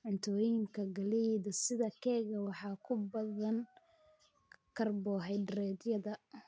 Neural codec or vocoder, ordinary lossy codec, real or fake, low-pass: none; none; real; none